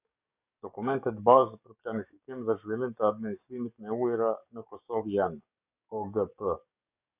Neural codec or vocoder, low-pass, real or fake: codec, 44.1 kHz, 7.8 kbps, DAC; 3.6 kHz; fake